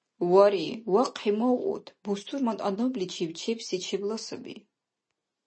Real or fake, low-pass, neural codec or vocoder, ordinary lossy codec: real; 9.9 kHz; none; MP3, 32 kbps